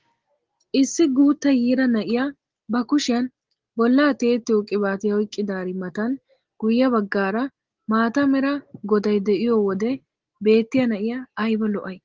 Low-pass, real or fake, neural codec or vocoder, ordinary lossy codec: 7.2 kHz; real; none; Opus, 16 kbps